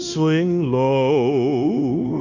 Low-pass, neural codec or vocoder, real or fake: 7.2 kHz; codec, 16 kHz, 0.9 kbps, LongCat-Audio-Codec; fake